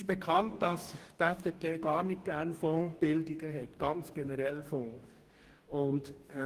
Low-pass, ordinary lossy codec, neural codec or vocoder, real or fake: 14.4 kHz; Opus, 24 kbps; codec, 44.1 kHz, 2.6 kbps, DAC; fake